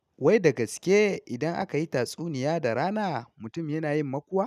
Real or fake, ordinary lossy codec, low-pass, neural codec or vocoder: real; none; 14.4 kHz; none